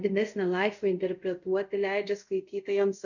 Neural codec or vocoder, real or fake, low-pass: codec, 24 kHz, 0.5 kbps, DualCodec; fake; 7.2 kHz